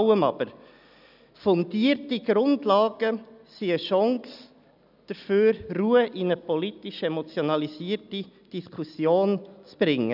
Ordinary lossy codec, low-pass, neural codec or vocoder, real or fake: none; 5.4 kHz; none; real